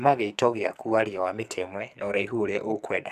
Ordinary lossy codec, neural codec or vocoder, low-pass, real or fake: none; codec, 44.1 kHz, 2.6 kbps, SNAC; 14.4 kHz; fake